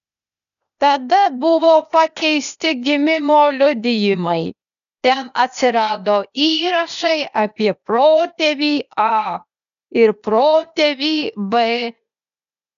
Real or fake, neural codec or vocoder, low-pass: fake; codec, 16 kHz, 0.8 kbps, ZipCodec; 7.2 kHz